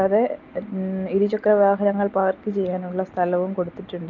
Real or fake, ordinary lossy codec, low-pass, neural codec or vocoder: real; Opus, 32 kbps; 7.2 kHz; none